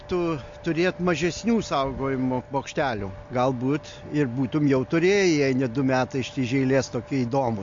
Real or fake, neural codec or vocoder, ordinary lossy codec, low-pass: real; none; MP3, 48 kbps; 7.2 kHz